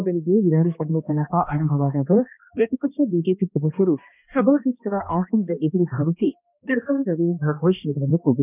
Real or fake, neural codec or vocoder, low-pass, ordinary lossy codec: fake; codec, 16 kHz, 1 kbps, X-Codec, HuBERT features, trained on balanced general audio; 3.6 kHz; none